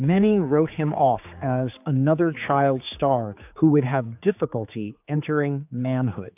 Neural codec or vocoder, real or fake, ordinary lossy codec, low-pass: codec, 16 kHz, 4 kbps, X-Codec, HuBERT features, trained on general audio; fake; AAC, 32 kbps; 3.6 kHz